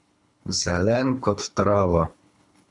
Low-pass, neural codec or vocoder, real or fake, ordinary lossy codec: 10.8 kHz; codec, 24 kHz, 3 kbps, HILCodec; fake; AAC, 64 kbps